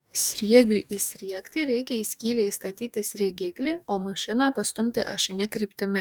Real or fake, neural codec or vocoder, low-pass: fake; codec, 44.1 kHz, 2.6 kbps, DAC; 19.8 kHz